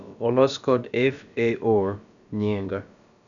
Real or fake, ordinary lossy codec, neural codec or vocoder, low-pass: fake; none; codec, 16 kHz, about 1 kbps, DyCAST, with the encoder's durations; 7.2 kHz